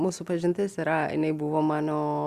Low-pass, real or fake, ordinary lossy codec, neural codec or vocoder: 14.4 kHz; real; Opus, 64 kbps; none